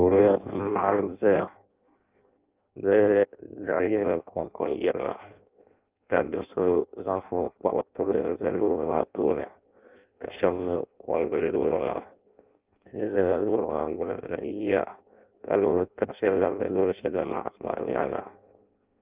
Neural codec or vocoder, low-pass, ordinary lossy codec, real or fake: codec, 16 kHz in and 24 kHz out, 0.6 kbps, FireRedTTS-2 codec; 3.6 kHz; Opus, 24 kbps; fake